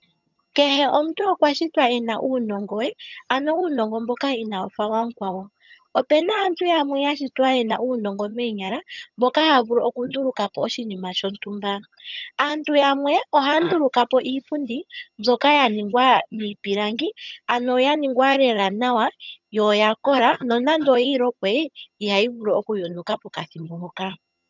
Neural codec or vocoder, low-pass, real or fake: vocoder, 22.05 kHz, 80 mel bands, HiFi-GAN; 7.2 kHz; fake